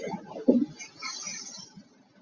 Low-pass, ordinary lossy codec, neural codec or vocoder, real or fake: 7.2 kHz; Opus, 64 kbps; none; real